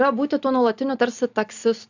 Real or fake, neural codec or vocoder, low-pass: real; none; 7.2 kHz